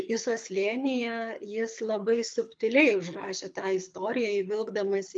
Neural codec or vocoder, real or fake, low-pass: codec, 24 kHz, 6 kbps, HILCodec; fake; 9.9 kHz